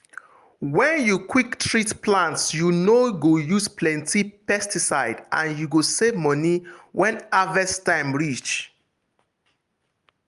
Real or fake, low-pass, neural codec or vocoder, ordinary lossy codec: real; 10.8 kHz; none; Opus, 32 kbps